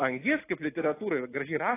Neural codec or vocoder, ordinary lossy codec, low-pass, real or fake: none; AAC, 16 kbps; 3.6 kHz; real